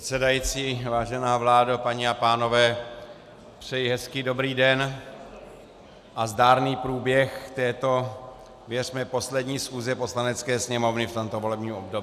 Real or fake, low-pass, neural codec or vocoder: real; 14.4 kHz; none